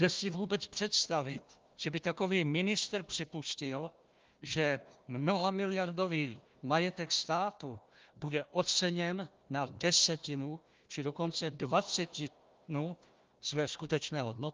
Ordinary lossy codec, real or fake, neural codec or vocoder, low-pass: Opus, 24 kbps; fake; codec, 16 kHz, 1 kbps, FunCodec, trained on Chinese and English, 50 frames a second; 7.2 kHz